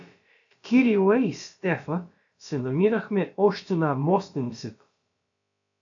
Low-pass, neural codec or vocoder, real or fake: 7.2 kHz; codec, 16 kHz, about 1 kbps, DyCAST, with the encoder's durations; fake